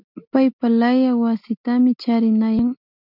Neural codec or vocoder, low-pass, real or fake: none; 5.4 kHz; real